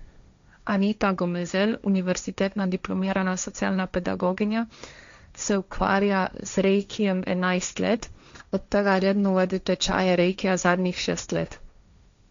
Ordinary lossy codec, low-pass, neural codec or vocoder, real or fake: MP3, 64 kbps; 7.2 kHz; codec, 16 kHz, 1.1 kbps, Voila-Tokenizer; fake